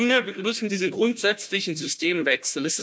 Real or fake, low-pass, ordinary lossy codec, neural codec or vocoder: fake; none; none; codec, 16 kHz, 1 kbps, FunCodec, trained on Chinese and English, 50 frames a second